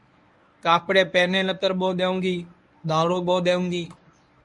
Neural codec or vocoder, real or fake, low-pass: codec, 24 kHz, 0.9 kbps, WavTokenizer, medium speech release version 1; fake; 10.8 kHz